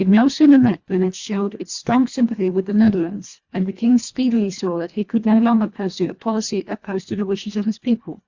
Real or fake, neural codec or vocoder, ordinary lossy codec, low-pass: fake; codec, 24 kHz, 1.5 kbps, HILCodec; Opus, 64 kbps; 7.2 kHz